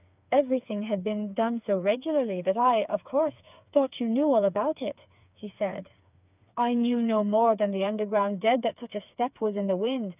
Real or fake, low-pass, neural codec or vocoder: fake; 3.6 kHz; codec, 16 kHz, 4 kbps, FreqCodec, smaller model